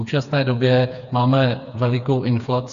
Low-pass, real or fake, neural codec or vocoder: 7.2 kHz; fake; codec, 16 kHz, 4 kbps, FreqCodec, smaller model